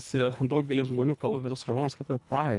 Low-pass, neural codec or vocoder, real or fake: 10.8 kHz; codec, 24 kHz, 1.5 kbps, HILCodec; fake